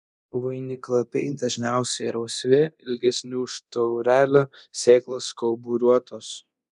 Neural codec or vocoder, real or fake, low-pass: codec, 24 kHz, 0.9 kbps, DualCodec; fake; 10.8 kHz